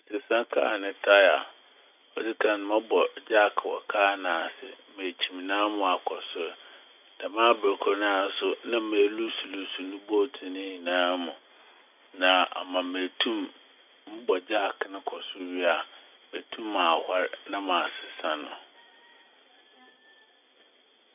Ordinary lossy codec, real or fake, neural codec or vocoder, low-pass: none; real; none; 3.6 kHz